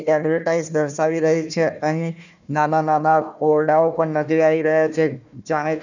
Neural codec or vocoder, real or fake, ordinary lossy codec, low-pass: codec, 16 kHz, 1 kbps, FunCodec, trained on Chinese and English, 50 frames a second; fake; none; 7.2 kHz